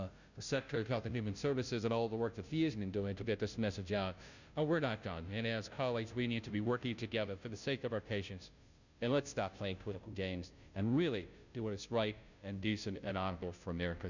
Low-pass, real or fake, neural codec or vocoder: 7.2 kHz; fake; codec, 16 kHz, 0.5 kbps, FunCodec, trained on Chinese and English, 25 frames a second